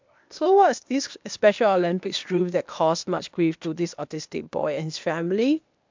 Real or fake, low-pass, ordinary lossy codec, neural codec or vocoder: fake; 7.2 kHz; MP3, 64 kbps; codec, 16 kHz, 0.8 kbps, ZipCodec